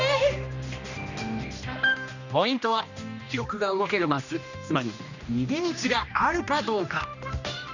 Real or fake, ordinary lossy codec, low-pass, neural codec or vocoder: fake; none; 7.2 kHz; codec, 16 kHz, 1 kbps, X-Codec, HuBERT features, trained on general audio